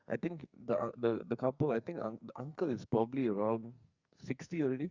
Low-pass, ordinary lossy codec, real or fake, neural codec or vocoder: 7.2 kHz; Opus, 64 kbps; fake; codec, 44.1 kHz, 2.6 kbps, SNAC